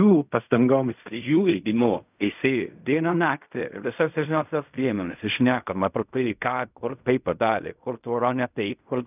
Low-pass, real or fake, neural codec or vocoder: 3.6 kHz; fake; codec, 16 kHz in and 24 kHz out, 0.4 kbps, LongCat-Audio-Codec, fine tuned four codebook decoder